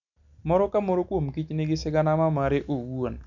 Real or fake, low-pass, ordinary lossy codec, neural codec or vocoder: real; 7.2 kHz; none; none